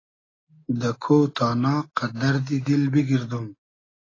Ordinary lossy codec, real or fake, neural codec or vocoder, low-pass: AAC, 32 kbps; real; none; 7.2 kHz